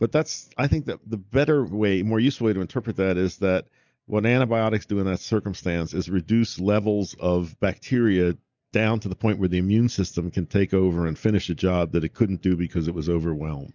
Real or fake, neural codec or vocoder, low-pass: real; none; 7.2 kHz